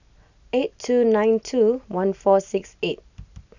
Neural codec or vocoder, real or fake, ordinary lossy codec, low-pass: none; real; none; 7.2 kHz